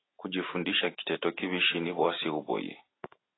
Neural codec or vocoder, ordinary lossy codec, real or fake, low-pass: none; AAC, 16 kbps; real; 7.2 kHz